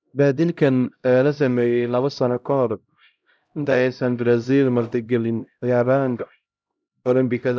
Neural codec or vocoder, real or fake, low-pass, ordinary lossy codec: codec, 16 kHz, 0.5 kbps, X-Codec, HuBERT features, trained on LibriSpeech; fake; none; none